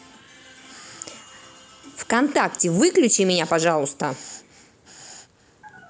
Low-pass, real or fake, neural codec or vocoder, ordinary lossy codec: none; real; none; none